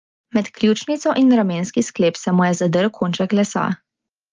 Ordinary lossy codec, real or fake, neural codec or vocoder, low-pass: Opus, 32 kbps; real; none; 7.2 kHz